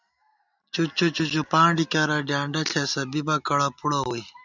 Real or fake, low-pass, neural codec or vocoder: real; 7.2 kHz; none